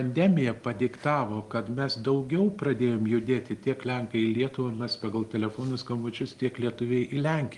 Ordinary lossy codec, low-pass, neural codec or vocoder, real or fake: Opus, 24 kbps; 10.8 kHz; none; real